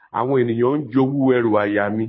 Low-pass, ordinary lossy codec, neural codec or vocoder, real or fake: 7.2 kHz; MP3, 24 kbps; codec, 24 kHz, 6 kbps, HILCodec; fake